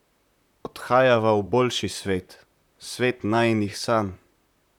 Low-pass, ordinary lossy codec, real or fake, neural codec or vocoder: 19.8 kHz; none; fake; vocoder, 44.1 kHz, 128 mel bands, Pupu-Vocoder